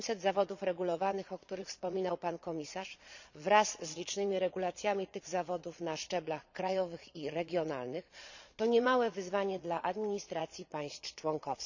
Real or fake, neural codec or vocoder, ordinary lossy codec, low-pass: fake; vocoder, 22.05 kHz, 80 mel bands, Vocos; none; 7.2 kHz